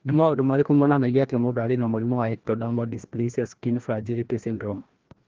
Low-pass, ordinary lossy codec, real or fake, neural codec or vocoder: 7.2 kHz; Opus, 16 kbps; fake; codec, 16 kHz, 1 kbps, FreqCodec, larger model